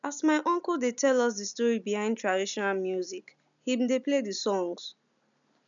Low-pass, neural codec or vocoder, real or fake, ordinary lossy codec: 7.2 kHz; none; real; none